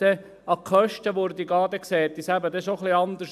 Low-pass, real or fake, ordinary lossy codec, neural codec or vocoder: 14.4 kHz; real; none; none